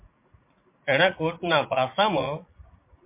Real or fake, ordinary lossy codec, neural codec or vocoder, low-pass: fake; MP3, 24 kbps; vocoder, 44.1 kHz, 80 mel bands, Vocos; 3.6 kHz